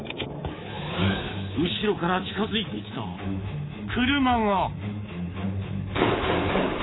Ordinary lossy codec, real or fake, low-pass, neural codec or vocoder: AAC, 16 kbps; fake; 7.2 kHz; codec, 24 kHz, 3.1 kbps, DualCodec